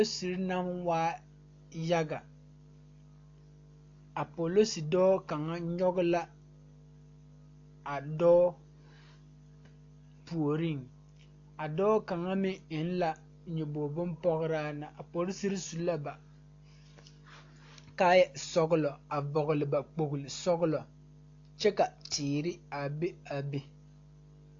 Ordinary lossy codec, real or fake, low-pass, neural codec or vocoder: AAC, 48 kbps; real; 7.2 kHz; none